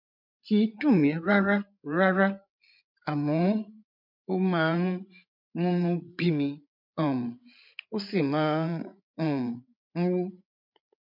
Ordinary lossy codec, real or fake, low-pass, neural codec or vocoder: MP3, 48 kbps; fake; 5.4 kHz; codec, 16 kHz, 8 kbps, FreqCodec, larger model